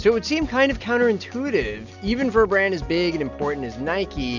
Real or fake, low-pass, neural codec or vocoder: real; 7.2 kHz; none